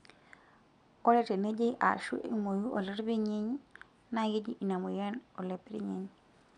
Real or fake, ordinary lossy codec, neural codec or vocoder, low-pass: real; none; none; 9.9 kHz